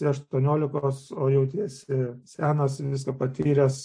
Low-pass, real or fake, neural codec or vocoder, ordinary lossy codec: 9.9 kHz; real; none; AAC, 64 kbps